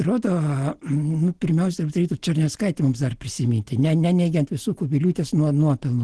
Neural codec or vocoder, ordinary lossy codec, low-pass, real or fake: none; Opus, 16 kbps; 10.8 kHz; real